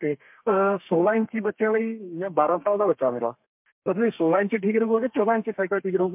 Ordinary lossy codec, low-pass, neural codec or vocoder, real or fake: MP3, 32 kbps; 3.6 kHz; codec, 32 kHz, 1.9 kbps, SNAC; fake